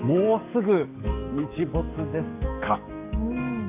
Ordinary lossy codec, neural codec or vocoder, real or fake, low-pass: none; codec, 44.1 kHz, 7.8 kbps, DAC; fake; 3.6 kHz